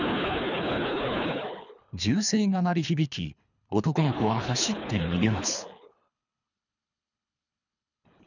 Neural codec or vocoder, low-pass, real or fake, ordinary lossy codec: codec, 24 kHz, 3 kbps, HILCodec; 7.2 kHz; fake; none